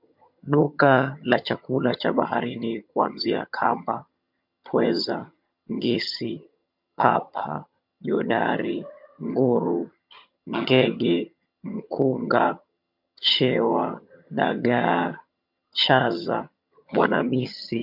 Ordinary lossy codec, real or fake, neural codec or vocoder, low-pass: MP3, 48 kbps; fake; vocoder, 22.05 kHz, 80 mel bands, HiFi-GAN; 5.4 kHz